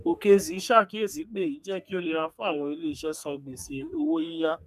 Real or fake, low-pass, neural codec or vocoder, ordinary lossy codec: fake; 14.4 kHz; codec, 32 kHz, 1.9 kbps, SNAC; none